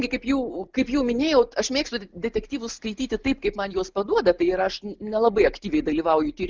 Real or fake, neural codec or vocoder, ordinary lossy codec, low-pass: real; none; Opus, 24 kbps; 7.2 kHz